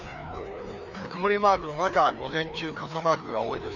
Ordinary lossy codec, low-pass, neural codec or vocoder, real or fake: none; 7.2 kHz; codec, 16 kHz, 2 kbps, FreqCodec, larger model; fake